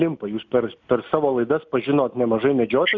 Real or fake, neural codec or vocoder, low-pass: real; none; 7.2 kHz